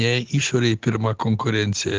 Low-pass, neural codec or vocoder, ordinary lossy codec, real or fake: 7.2 kHz; none; Opus, 16 kbps; real